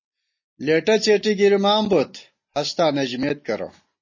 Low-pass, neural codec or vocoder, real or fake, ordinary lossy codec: 7.2 kHz; none; real; MP3, 32 kbps